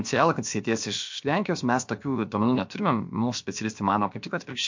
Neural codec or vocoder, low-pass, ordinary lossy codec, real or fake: codec, 16 kHz, about 1 kbps, DyCAST, with the encoder's durations; 7.2 kHz; MP3, 48 kbps; fake